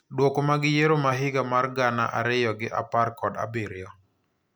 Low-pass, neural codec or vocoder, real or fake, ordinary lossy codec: none; none; real; none